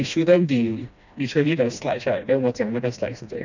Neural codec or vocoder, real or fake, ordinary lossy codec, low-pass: codec, 16 kHz, 1 kbps, FreqCodec, smaller model; fake; none; 7.2 kHz